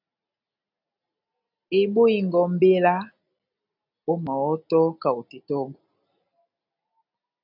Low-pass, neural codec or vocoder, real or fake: 5.4 kHz; none; real